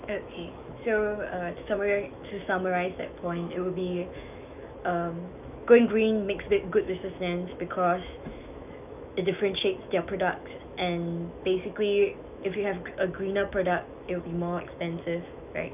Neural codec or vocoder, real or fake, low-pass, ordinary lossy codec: autoencoder, 48 kHz, 128 numbers a frame, DAC-VAE, trained on Japanese speech; fake; 3.6 kHz; none